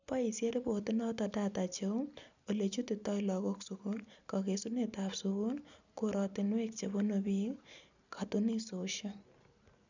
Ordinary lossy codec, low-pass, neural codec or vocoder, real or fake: none; 7.2 kHz; none; real